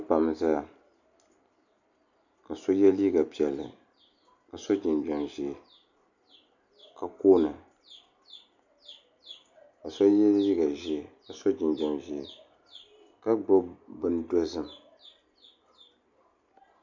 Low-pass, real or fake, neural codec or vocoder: 7.2 kHz; real; none